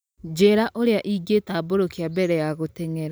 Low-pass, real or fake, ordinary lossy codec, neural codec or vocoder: none; real; none; none